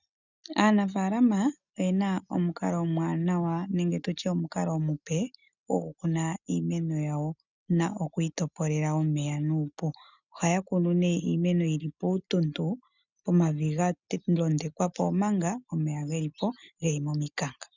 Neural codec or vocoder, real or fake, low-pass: none; real; 7.2 kHz